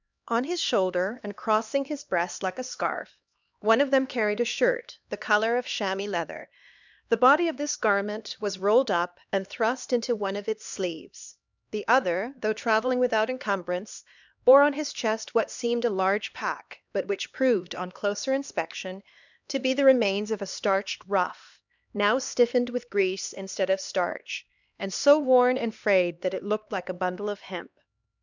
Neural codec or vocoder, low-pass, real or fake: codec, 16 kHz, 2 kbps, X-Codec, HuBERT features, trained on LibriSpeech; 7.2 kHz; fake